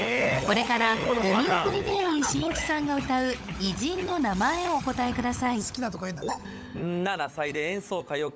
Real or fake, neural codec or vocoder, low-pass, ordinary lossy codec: fake; codec, 16 kHz, 16 kbps, FunCodec, trained on LibriTTS, 50 frames a second; none; none